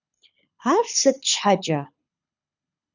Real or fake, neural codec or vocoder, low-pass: fake; codec, 24 kHz, 6 kbps, HILCodec; 7.2 kHz